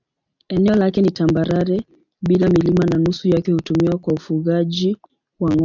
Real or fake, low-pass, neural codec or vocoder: real; 7.2 kHz; none